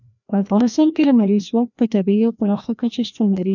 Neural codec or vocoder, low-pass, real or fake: codec, 16 kHz, 1 kbps, FreqCodec, larger model; 7.2 kHz; fake